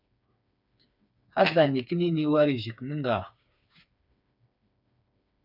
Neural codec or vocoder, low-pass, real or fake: codec, 16 kHz, 4 kbps, FreqCodec, smaller model; 5.4 kHz; fake